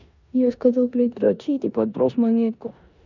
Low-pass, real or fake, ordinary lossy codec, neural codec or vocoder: 7.2 kHz; fake; none; codec, 16 kHz in and 24 kHz out, 0.9 kbps, LongCat-Audio-Codec, four codebook decoder